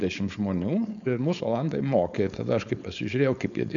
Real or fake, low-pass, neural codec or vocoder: fake; 7.2 kHz; codec, 16 kHz, 4.8 kbps, FACodec